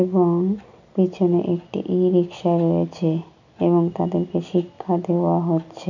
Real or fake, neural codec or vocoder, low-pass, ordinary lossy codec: real; none; 7.2 kHz; none